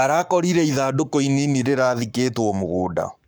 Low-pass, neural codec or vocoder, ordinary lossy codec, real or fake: 19.8 kHz; codec, 44.1 kHz, 7.8 kbps, Pupu-Codec; none; fake